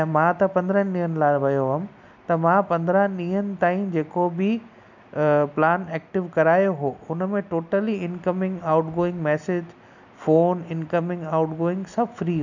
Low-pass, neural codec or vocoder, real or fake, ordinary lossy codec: 7.2 kHz; none; real; none